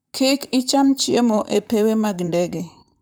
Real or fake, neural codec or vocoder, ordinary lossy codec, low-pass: fake; vocoder, 44.1 kHz, 128 mel bands, Pupu-Vocoder; none; none